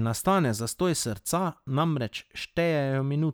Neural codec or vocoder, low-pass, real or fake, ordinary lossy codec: none; none; real; none